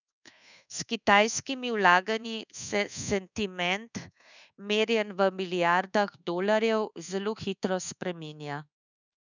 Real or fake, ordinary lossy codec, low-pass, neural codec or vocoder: fake; none; 7.2 kHz; codec, 24 kHz, 1.2 kbps, DualCodec